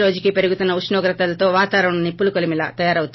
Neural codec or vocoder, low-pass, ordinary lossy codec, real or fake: none; 7.2 kHz; MP3, 24 kbps; real